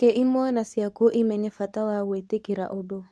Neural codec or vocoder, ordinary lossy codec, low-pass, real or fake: codec, 24 kHz, 0.9 kbps, WavTokenizer, medium speech release version 2; none; none; fake